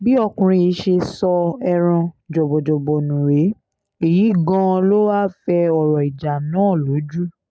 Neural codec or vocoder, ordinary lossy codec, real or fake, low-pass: none; none; real; none